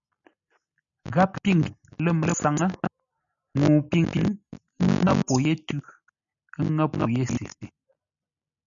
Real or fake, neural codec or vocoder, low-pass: real; none; 7.2 kHz